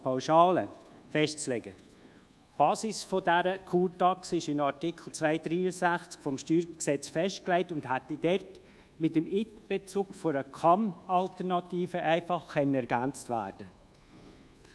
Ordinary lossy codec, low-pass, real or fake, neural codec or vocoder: none; none; fake; codec, 24 kHz, 1.2 kbps, DualCodec